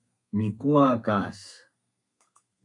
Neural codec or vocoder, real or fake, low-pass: codec, 32 kHz, 1.9 kbps, SNAC; fake; 10.8 kHz